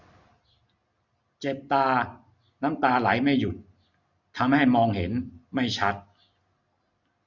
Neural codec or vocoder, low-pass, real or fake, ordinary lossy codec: none; 7.2 kHz; real; none